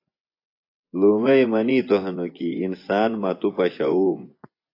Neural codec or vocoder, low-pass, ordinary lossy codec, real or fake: vocoder, 24 kHz, 100 mel bands, Vocos; 5.4 kHz; AAC, 32 kbps; fake